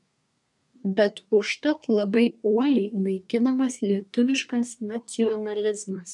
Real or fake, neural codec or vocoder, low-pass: fake; codec, 24 kHz, 1 kbps, SNAC; 10.8 kHz